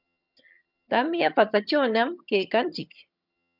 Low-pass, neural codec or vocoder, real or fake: 5.4 kHz; vocoder, 22.05 kHz, 80 mel bands, HiFi-GAN; fake